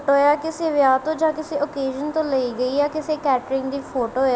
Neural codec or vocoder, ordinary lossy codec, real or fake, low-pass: none; none; real; none